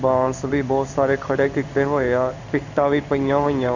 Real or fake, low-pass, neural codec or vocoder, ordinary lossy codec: fake; 7.2 kHz; codec, 16 kHz in and 24 kHz out, 1 kbps, XY-Tokenizer; none